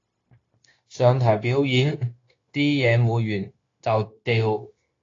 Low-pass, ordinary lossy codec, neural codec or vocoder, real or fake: 7.2 kHz; AAC, 32 kbps; codec, 16 kHz, 0.9 kbps, LongCat-Audio-Codec; fake